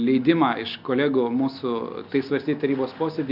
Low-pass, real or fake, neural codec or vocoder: 5.4 kHz; real; none